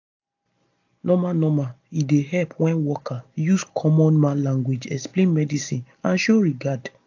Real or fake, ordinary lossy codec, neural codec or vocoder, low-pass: real; AAC, 48 kbps; none; 7.2 kHz